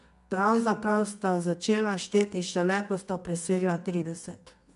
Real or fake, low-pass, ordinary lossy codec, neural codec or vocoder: fake; 10.8 kHz; MP3, 96 kbps; codec, 24 kHz, 0.9 kbps, WavTokenizer, medium music audio release